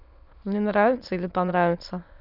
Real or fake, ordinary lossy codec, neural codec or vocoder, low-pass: fake; none; autoencoder, 22.05 kHz, a latent of 192 numbers a frame, VITS, trained on many speakers; 5.4 kHz